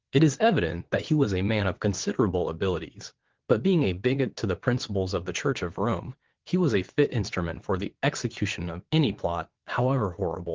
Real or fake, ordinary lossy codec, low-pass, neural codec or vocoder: fake; Opus, 16 kbps; 7.2 kHz; vocoder, 22.05 kHz, 80 mel bands, WaveNeXt